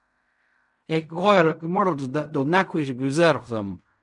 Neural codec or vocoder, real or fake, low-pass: codec, 16 kHz in and 24 kHz out, 0.4 kbps, LongCat-Audio-Codec, fine tuned four codebook decoder; fake; 10.8 kHz